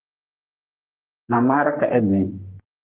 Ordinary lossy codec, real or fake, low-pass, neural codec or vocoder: Opus, 16 kbps; fake; 3.6 kHz; codec, 44.1 kHz, 3.4 kbps, Pupu-Codec